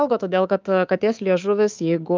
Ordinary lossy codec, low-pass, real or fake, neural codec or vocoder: Opus, 24 kbps; 7.2 kHz; fake; codec, 24 kHz, 3.1 kbps, DualCodec